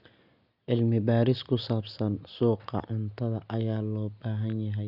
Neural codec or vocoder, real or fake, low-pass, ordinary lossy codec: none; real; 5.4 kHz; none